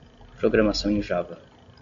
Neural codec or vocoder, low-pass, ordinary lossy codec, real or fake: none; 7.2 kHz; MP3, 48 kbps; real